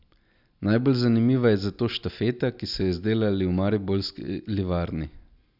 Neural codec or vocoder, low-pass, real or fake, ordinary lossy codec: none; 5.4 kHz; real; none